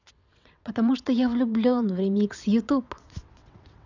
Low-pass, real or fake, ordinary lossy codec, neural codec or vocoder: 7.2 kHz; fake; none; vocoder, 22.05 kHz, 80 mel bands, WaveNeXt